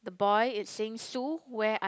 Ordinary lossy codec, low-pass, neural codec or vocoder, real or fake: none; none; none; real